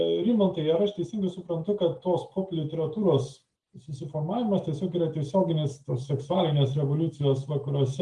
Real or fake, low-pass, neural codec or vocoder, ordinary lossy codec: real; 10.8 kHz; none; Opus, 32 kbps